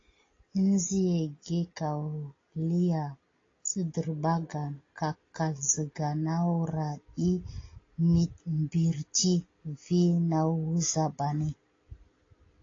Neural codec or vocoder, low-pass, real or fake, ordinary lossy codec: none; 7.2 kHz; real; AAC, 32 kbps